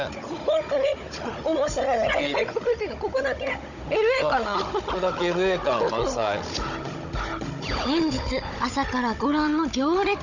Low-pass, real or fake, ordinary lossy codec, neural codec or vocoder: 7.2 kHz; fake; none; codec, 16 kHz, 16 kbps, FunCodec, trained on Chinese and English, 50 frames a second